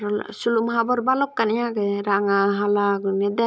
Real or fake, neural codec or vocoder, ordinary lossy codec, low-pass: real; none; none; none